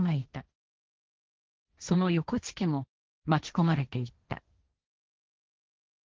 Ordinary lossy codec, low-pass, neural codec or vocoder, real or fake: Opus, 16 kbps; 7.2 kHz; codec, 16 kHz, 1.1 kbps, Voila-Tokenizer; fake